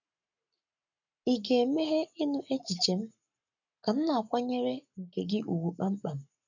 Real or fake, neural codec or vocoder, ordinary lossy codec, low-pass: fake; codec, 44.1 kHz, 7.8 kbps, Pupu-Codec; none; 7.2 kHz